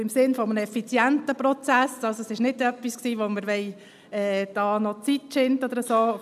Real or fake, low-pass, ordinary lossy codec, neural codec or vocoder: real; 14.4 kHz; none; none